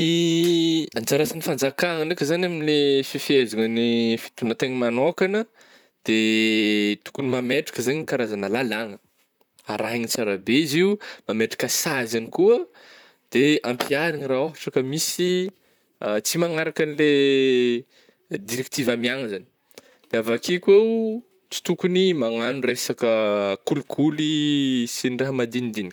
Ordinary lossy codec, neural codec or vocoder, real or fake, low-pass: none; vocoder, 44.1 kHz, 128 mel bands, Pupu-Vocoder; fake; none